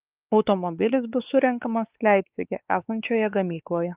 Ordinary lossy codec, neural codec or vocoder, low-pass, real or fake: Opus, 32 kbps; codec, 16 kHz, 4 kbps, X-Codec, WavLM features, trained on Multilingual LibriSpeech; 3.6 kHz; fake